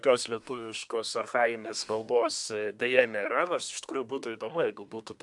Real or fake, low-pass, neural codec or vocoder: fake; 10.8 kHz; codec, 24 kHz, 1 kbps, SNAC